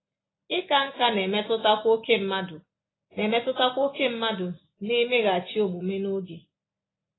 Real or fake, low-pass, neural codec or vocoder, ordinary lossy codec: real; 7.2 kHz; none; AAC, 16 kbps